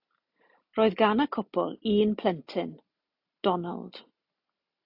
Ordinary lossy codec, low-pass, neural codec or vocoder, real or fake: AAC, 48 kbps; 5.4 kHz; none; real